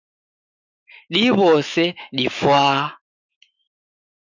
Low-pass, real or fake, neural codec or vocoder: 7.2 kHz; fake; autoencoder, 48 kHz, 128 numbers a frame, DAC-VAE, trained on Japanese speech